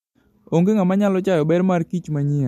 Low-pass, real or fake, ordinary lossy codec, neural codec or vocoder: 14.4 kHz; real; MP3, 64 kbps; none